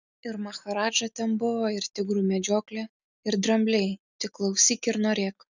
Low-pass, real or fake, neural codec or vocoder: 7.2 kHz; real; none